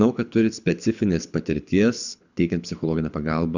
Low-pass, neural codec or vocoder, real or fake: 7.2 kHz; codec, 24 kHz, 6 kbps, HILCodec; fake